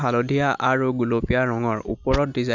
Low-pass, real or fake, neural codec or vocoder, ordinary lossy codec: 7.2 kHz; real; none; none